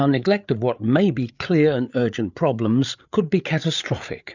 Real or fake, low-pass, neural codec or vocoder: fake; 7.2 kHz; codec, 16 kHz, 8 kbps, FreqCodec, larger model